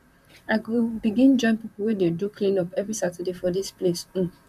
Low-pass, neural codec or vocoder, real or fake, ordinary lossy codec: 14.4 kHz; vocoder, 44.1 kHz, 128 mel bands, Pupu-Vocoder; fake; none